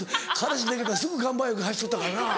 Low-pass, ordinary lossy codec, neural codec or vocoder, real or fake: none; none; none; real